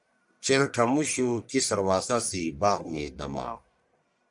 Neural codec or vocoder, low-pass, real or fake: codec, 44.1 kHz, 1.7 kbps, Pupu-Codec; 10.8 kHz; fake